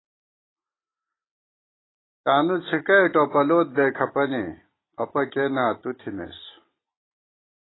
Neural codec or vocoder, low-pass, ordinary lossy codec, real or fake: none; 7.2 kHz; AAC, 16 kbps; real